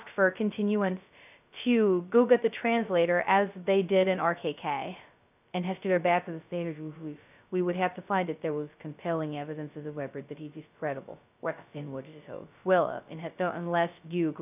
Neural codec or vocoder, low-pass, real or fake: codec, 16 kHz, 0.2 kbps, FocalCodec; 3.6 kHz; fake